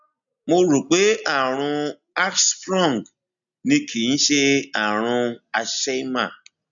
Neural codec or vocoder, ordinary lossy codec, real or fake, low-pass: none; none; real; 7.2 kHz